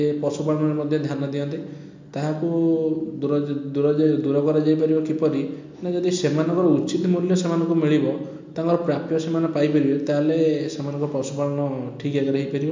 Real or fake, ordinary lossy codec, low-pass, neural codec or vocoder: real; MP3, 48 kbps; 7.2 kHz; none